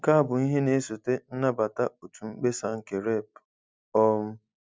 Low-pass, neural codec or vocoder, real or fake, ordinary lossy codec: none; none; real; none